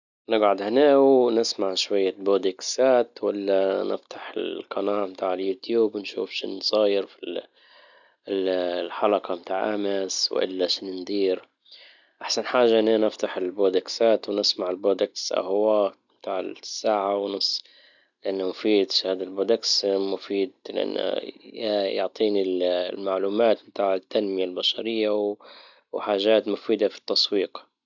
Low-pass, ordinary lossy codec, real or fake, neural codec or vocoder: 7.2 kHz; none; real; none